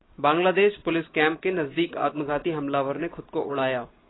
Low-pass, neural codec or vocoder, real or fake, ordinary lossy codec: 7.2 kHz; none; real; AAC, 16 kbps